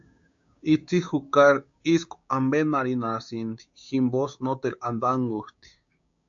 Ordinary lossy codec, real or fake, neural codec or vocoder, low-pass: AAC, 64 kbps; fake; codec, 16 kHz, 6 kbps, DAC; 7.2 kHz